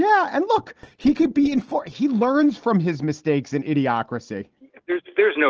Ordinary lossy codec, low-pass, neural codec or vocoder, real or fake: Opus, 16 kbps; 7.2 kHz; none; real